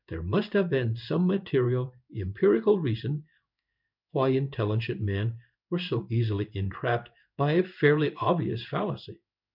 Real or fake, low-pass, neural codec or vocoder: fake; 5.4 kHz; codec, 16 kHz in and 24 kHz out, 1 kbps, XY-Tokenizer